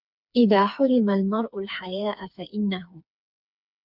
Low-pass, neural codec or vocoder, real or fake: 5.4 kHz; codec, 16 kHz, 4 kbps, FreqCodec, smaller model; fake